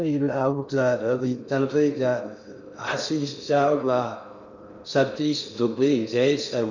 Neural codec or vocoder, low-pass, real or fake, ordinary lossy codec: codec, 16 kHz in and 24 kHz out, 0.6 kbps, FocalCodec, streaming, 2048 codes; 7.2 kHz; fake; none